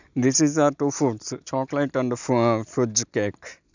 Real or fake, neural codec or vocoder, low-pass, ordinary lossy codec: real; none; 7.2 kHz; none